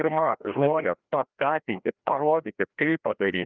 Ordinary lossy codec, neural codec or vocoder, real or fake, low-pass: Opus, 24 kbps; codec, 16 kHz, 1 kbps, FreqCodec, larger model; fake; 7.2 kHz